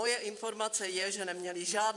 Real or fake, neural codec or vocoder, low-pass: fake; vocoder, 44.1 kHz, 128 mel bands every 512 samples, BigVGAN v2; 10.8 kHz